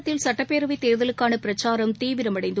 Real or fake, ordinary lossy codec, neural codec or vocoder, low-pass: real; none; none; none